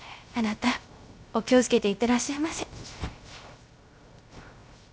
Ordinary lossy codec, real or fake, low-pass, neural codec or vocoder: none; fake; none; codec, 16 kHz, 0.3 kbps, FocalCodec